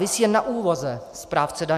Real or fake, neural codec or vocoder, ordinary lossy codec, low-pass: real; none; AAC, 96 kbps; 14.4 kHz